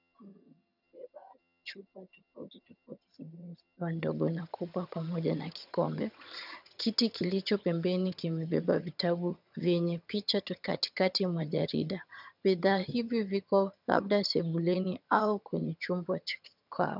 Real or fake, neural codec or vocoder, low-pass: fake; vocoder, 22.05 kHz, 80 mel bands, HiFi-GAN; 5.4 kHz